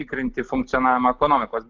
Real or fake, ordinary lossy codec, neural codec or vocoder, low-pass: real; Opus, 24 kbps; none; 7.2 kHz